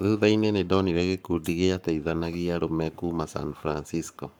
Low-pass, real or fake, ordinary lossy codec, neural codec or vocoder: none; fake; none; codec, 44.1 kHz, 7.8 kbps, Pupu-Codec